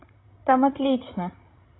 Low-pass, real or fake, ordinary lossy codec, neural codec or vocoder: 7.2 kHz; fake; AAC, 16 kbps; codec, 16 kHz, 16 kbps, FreqCodec, larger model